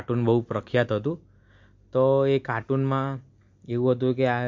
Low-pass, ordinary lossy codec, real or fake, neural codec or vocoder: 7.2 kHz; MP3, 48 kbps; real; none